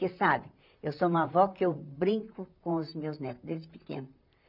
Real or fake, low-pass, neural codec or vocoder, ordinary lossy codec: fake; 5.4 kHz; vocoder, 44.1 kHz, 128 mel bands, Pupu-Vocoder; none